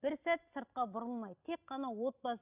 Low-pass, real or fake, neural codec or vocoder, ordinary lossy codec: 3.6 kHz; real; none; none